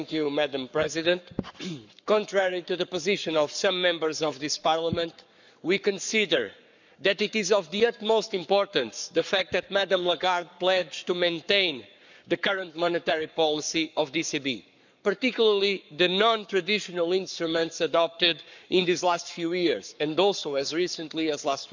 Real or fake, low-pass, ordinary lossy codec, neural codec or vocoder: fake; 7.2 kHz; none; codec, 44.1 kHz, 7.8 kbps, Pupu-Codec